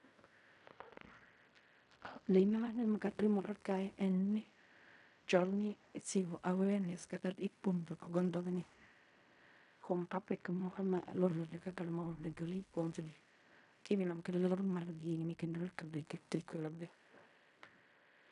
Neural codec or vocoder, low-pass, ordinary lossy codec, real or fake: codec, 16 kHz in and 24 kHz out, 0.4 kbps, LongCat-Audio-Codec, fine tuned four codebook decoder; 10.8 kHz; none; fake